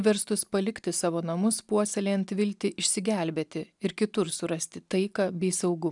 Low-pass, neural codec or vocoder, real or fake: 10.8 kHz; none; real